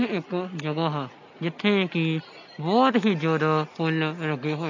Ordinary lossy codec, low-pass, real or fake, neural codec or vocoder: none; 7.2 kHz; real; none